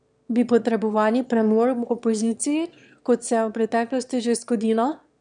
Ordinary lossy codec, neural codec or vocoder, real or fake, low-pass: none; autoencoder, 22.05 kHz, a latent of 192 numbers a frame, VITS, trained on one speaker; fake; 9.9 kHz